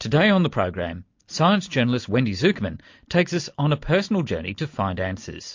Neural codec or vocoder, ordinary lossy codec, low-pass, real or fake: none; MP3, 48 kbps; 7.2 kHz; real